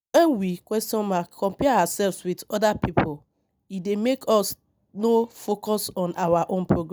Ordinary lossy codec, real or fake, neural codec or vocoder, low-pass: none; real; none; none